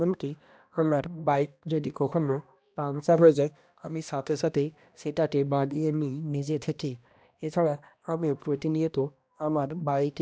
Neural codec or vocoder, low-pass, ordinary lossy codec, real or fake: codec, 16 kHz, 1 kbps, X-Codec, HuBERT features, trained on balanced general audio; none; none; fake